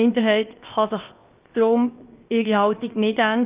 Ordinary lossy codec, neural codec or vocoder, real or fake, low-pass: Opus, 24 kbps; codec, 16 kHz, 0.7 kbps, FocalCodec; fake; 3.6 kHz